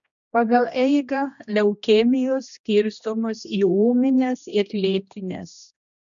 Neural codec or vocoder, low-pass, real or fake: codec, 16 kHz, 1 kbps, X-Codec, HuBERT features, trained on general audio; 7.2 kHz; fake